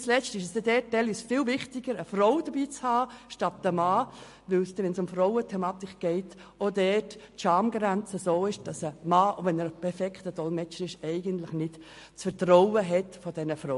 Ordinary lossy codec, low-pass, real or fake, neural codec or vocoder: MP3, 48 kbps; 14.4 kHz; real; none